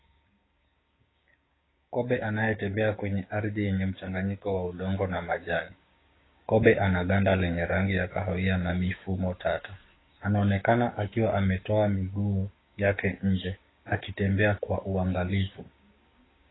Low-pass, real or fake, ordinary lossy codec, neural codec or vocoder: 7.2 kHz; fake; AAC, 16 kbps; codec, 16 kHz, 6 kbps, DAC